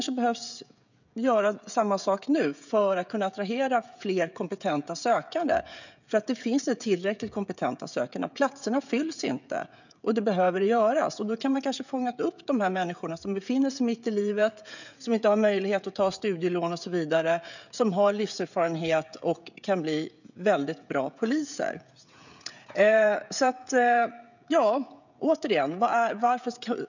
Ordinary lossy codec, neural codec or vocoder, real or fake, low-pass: none; codec, 16 kHz, 16 kbps, FreqCodec, smaller model; fake; 7.2 kHz